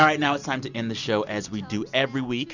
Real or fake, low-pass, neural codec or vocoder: real; 7.2 kHz; none